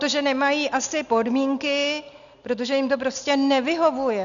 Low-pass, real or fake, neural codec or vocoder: 7.2 kHz; real; none